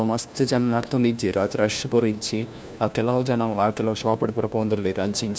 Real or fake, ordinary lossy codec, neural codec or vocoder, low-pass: fake; none; codec, 16 kHz, 1 kbps, FunCodec, trained on LibriTTS, 50 frames a second; none